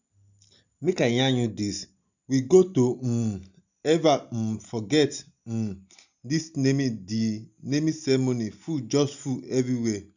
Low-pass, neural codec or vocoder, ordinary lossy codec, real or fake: 7.2 kHz; none; none; real